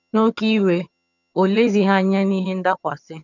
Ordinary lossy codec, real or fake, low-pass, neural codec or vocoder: none; fake; 7.2 kHz; vocoder, 22.05 kHz, 80 mel bands, HiFi-GAN